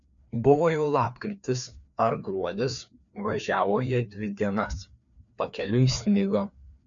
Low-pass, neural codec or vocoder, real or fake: 7.2 kHz; codec, 16 kHz, 2 kbps, FreqCodec, larger model; fake